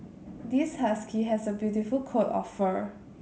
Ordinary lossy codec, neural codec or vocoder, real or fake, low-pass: none; none; real; none